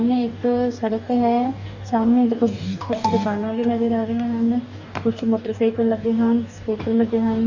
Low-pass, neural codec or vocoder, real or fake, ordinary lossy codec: 7.2 kHz; codec, 44.1 kHz, 2.6 kbps, DAC; fake; none